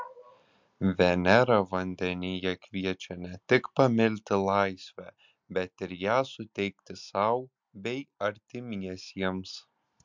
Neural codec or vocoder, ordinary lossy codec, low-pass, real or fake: none; MP3, 64 kbps; 7.2 kHz; real